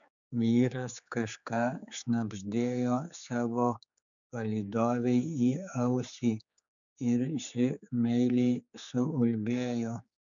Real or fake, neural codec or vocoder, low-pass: fake; codec, 16 kHz, 4 kbps, X-Codec, HuBERT features, trained on general audio; 7.2 kHz